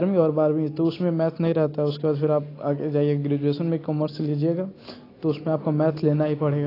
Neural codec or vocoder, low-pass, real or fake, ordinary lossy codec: none; 5.4 kHz; real; AAC, 24 kbps